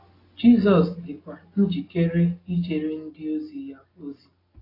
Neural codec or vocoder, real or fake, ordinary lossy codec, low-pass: none; real; AAC, 24 kbps; 5.4 kHz